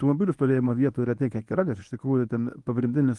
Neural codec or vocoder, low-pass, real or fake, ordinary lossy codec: codec, 24 kHz, 0.9 kbps, WavTokenizer, medium speech release version 1; 10.8 kHz; fake; Opus, 24 kbps